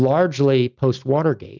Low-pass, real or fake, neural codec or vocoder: 7.2 kHz; real; none